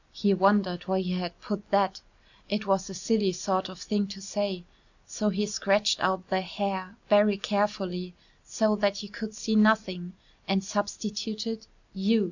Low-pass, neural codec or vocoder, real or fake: 7.2 kHz; none; real